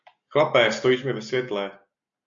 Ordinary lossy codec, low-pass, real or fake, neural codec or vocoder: MP3, 48 kbps; 7.2 kHz; real; none